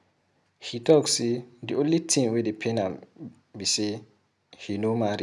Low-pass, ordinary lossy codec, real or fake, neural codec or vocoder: none; none; real; none